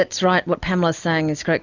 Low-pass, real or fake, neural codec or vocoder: 7.2 kHz; real; none